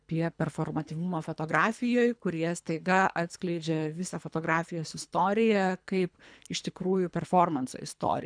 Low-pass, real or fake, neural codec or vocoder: 9.9 kHz; fake; codec, 24 kHz, 3 kbps, HILCodec